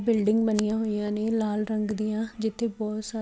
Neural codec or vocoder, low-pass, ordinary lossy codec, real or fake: none; none; none; real